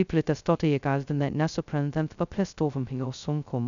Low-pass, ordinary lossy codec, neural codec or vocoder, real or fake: 7.2 kHz; MP3, 96 kbps; codec, 16 kHz, 0.2 kbps, FocalCodec; fake